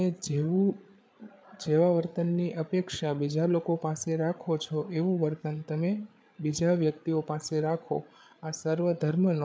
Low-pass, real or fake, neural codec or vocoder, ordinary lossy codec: none; fake; codec, 16 kHz, 8 kbps, FreqCodec, larger model; none